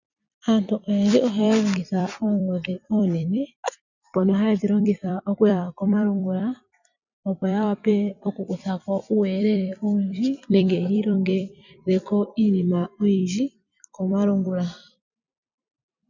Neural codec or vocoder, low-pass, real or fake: vocoder, 44.1 kHz, 128 mel bands every 256 samples, BigVGAN v2; 7.2 kHz; fake